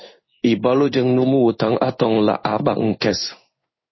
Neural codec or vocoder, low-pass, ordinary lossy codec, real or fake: codec, 16 kHz in and 24 kHz out, 1 kbps, XY-Tokenizer; 7.2 kHz; MP3, 24 kbps; fake